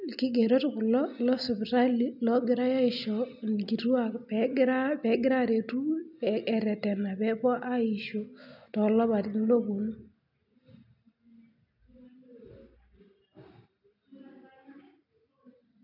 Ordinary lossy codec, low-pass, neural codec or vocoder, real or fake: none; 5.4 kHz; none; real